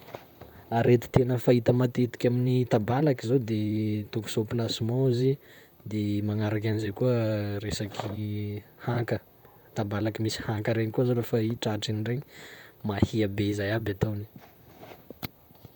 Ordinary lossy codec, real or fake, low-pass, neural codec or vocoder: none; fake; 19.8 kHz; vocoder, 44.1 kHz, 128 mel bands, Pupu-Vocoder